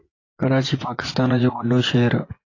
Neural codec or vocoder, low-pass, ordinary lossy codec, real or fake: vocoder, 24 kHz, 100 mel bands, Vocos; 7.2 kHz; AAC, 32 kbps; fake